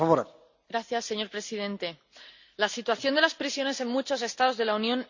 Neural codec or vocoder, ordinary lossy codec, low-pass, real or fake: none; Opus, 64 kbps; 7.2 kHz; real